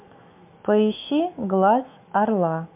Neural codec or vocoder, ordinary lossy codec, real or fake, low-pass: autoencoder, 48 kHz, 128 numbers a frame, DAC-VAE, trained on Japanese speech; MP3, 32 kbps; fake; 3.6 kHz